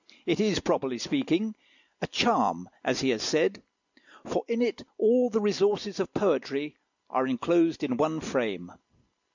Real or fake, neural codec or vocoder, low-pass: real; none; 7.2 kHz